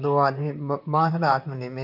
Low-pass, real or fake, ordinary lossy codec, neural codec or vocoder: 5.4 kHz; fake; none; vocoder, 44.1 kHz, 128 mel bands, Pupu-Vocoder